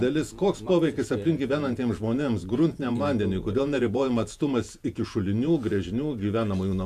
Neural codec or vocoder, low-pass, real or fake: vocoder, 48 kHz, 128 mel bands, Vocos; 14.4 kHz; fake